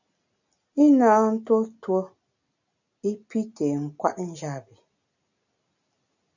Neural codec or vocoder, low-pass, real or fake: none; 7.2 kHz; real